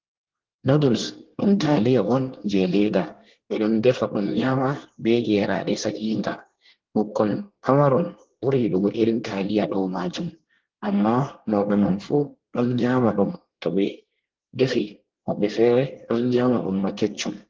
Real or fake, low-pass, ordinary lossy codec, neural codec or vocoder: fake; 7.2 kHz; Opus, 16 kbps; codec, 24 kHz, 1 kbps, SNAC